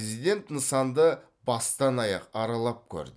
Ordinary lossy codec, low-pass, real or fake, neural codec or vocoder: none; none; real; none